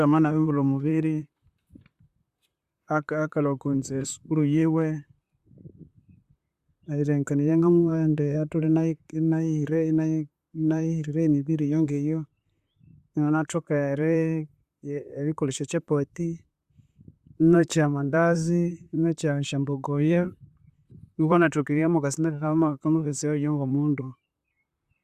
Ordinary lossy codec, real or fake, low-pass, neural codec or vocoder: Opus, 64 kbps; fake; 14.4 kHz; vocoder, 44.1 kHz, 128 mel bands, Pupu-Vocoder